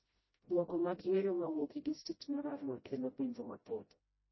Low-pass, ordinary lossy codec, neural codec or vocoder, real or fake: 7.2 kHz; MP3, 24 kbps; codec, 16 kHz, 0.5 kbps, FreqCodec, smaller model; fake